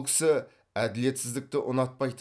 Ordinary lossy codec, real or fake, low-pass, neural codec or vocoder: none; real; none; none